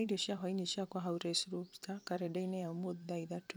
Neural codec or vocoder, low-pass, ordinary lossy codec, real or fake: vocoder, 44.1 kHz, 128 mel bands every 256 samples, BigVGAN v2; none; none; fake